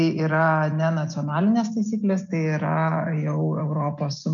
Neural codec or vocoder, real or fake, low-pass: none; real; 7.2 kHz